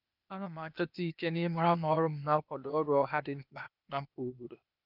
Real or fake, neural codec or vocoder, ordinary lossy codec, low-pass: fake; codec, 16 kHz, 0.8 kbps, ZipCodec; none; 5.4 kHz